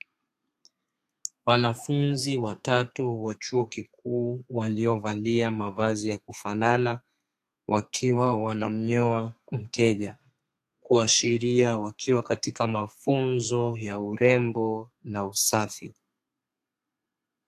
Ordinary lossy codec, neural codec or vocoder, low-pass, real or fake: AAC, 64 kbps; codec, 32 kHz, 1.9 kbps, SNAC; 14.4 kHz; fake